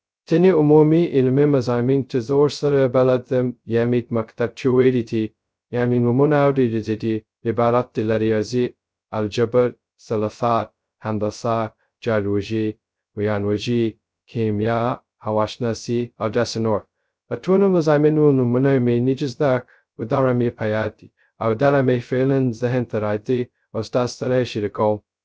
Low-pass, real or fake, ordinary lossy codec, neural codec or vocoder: none; fake; none; codec, 16 kHz, 0.2 kbps, FocalCodec